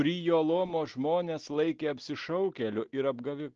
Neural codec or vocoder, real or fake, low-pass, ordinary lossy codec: none; real; 7.2 kHz; Opus, 16 kbps